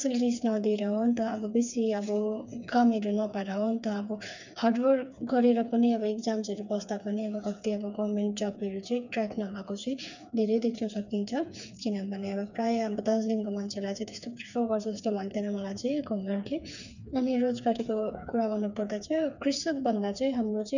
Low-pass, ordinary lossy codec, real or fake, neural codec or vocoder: 7.2 kHz; none; fake; codec, 16 kHz, 4 kbps, FreqCodec, smaller model